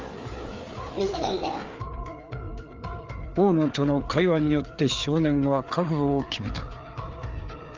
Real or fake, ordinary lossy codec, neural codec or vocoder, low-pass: fake; Opus, 32 kbps; codec, 16 kHz, 4 kbps, FreqCodec, larger model; 7.2 kHz